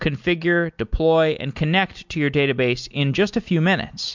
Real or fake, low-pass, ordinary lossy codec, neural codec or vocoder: real; 7.2 kHz; MP3, 64 kbps; none